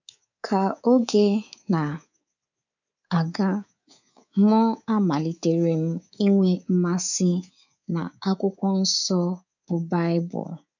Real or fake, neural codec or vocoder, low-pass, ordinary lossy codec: fake; codec, 24 kHz, 3.1 kbps, DualCodec; 7.2 kHz; none